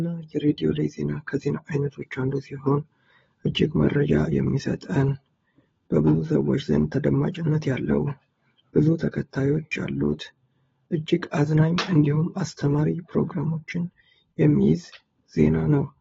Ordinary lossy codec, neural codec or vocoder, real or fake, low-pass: AAC, 24 kbps; codec, 16 kHz, 16 kbps, FunCodec, trained on LibriTTS, 50 frames a second; fake; 7.2 kHz